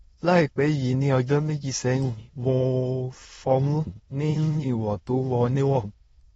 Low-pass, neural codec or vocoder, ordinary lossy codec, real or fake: 9.9 kHz; autoencoder, 22.05 kHz, a latent of 192 numbers a frame, VITS, trained on many speakers; AAC, 24 kbps; fake